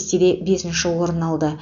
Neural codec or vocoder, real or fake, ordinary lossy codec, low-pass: none; real; none; 7.2 kHz